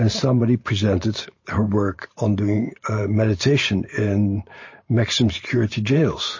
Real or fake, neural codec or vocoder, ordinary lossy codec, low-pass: fake; vocoder, 44.1 kHz, 128 mel bands every 512 samples, BigVGAN v2; MP3, 32 kbps; 7.2 kHz